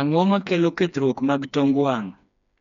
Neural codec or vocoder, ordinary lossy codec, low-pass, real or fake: codec, 16 kHz, 2 kbps, FreqCodec, smaller model; MP3, 96 kbps; 7.2 kHz; fake